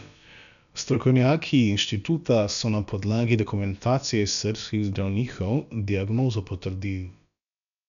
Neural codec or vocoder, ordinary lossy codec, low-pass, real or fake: codec, 16 kHz, about 1 kbps, DyCAST, with the encoder's durations; none; 7.2 kHz; fake